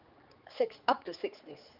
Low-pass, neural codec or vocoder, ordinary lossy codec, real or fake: 5.4 kHz; codec, 16 kHz, 4 kbps, X-Codec, HuBERT features, trained on general audio; Opus, 24 kbps; fake